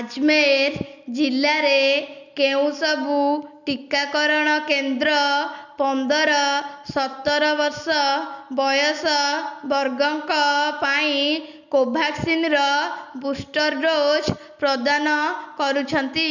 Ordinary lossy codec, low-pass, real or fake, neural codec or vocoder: none; 7.2 kHz; real; none